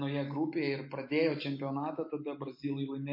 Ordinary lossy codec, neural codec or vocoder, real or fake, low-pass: MP3, 32 kbps; none; real; 5.4 kHz